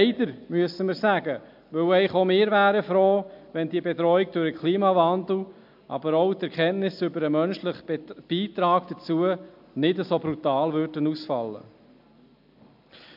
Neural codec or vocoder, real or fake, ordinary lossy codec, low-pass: none; real; MP3, 48 kbps; 5.4 kHz